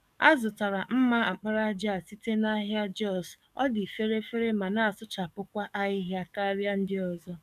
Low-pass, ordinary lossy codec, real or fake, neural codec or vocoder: 14.4 kHz; none; fake; codec, 44.1 kHz, 7.8 kbps, DAC